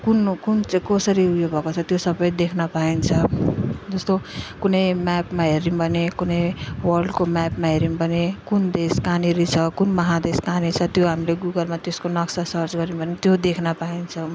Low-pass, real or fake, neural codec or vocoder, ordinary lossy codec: none; real; none; none